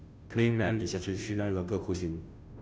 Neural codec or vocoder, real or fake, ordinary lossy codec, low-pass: codec, 16 kHz, 0.5 kbps, FunCodec, trained on Chinese and English, 25 frames a second; fake; none; none